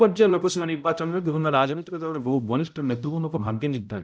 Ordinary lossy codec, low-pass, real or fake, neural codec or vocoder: none; none; fake; codec, 16 kHz, 0.5 kbps, X-Codec, HuBERT features, trained on balanced general audio